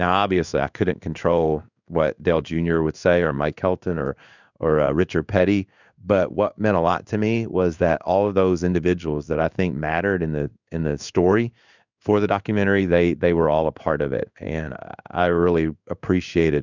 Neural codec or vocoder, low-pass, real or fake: codec, 16 kHz in and 24 kHz out, 1 kbps, XY-Tokenizer; 7.2 kHz; fake